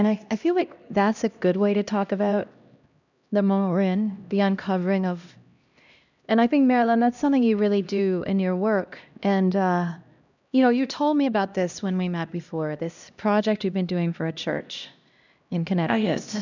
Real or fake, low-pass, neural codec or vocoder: fake; 7.2 kHz; codec, 16 kHz, 1 kbps, X-Codec, HuBERT features, trained on LibriSpeech